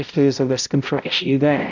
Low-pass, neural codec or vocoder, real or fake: 7.2 kHz; codec, 16 kHz, 0.5 kbps, X-Codec, HuBERT features, trained on balanced general audio; fake